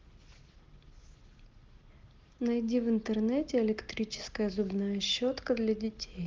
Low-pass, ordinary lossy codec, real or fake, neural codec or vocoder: 7.2 kHz; Opus, 16 kbps; real; none